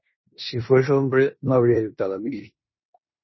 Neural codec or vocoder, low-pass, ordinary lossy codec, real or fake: codec, 16 kHz in and 24 kHz out, 0.9 kbps, LongCat-Audio-Codec, four codebook decoder; 7.2 kHz; MP3, 24 kbps; fake